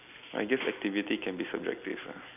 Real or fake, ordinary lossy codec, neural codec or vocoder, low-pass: real; none; none; 3.6 kHz